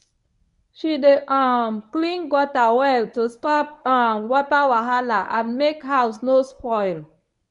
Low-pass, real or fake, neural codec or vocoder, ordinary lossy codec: 10.8 kHz; fake; codec, 24 kHz, 0.9 kbps, WavTokenizer, medium speech release version 1; none